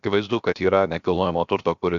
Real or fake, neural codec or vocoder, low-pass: fake; codec, 16 kHz, about 1 kbps, DyCAST, with the encoder's durations; 7.2 kHz